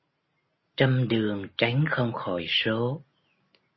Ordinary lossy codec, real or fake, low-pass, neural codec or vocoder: MP3, 24 kbps; real; 7.2 kHz; none